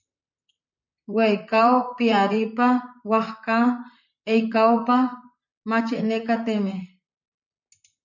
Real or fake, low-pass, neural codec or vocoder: fake; 7.2 kHz; vocoder, 44.1 kHz, 128 mel bands, Pupu-Vocoder